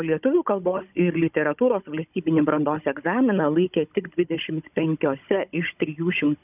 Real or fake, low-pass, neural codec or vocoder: fake; 3.6 kHz; codec, 16 kHz, 16 kbps, FunCodec, trained on Chinese and English, 50 frames a second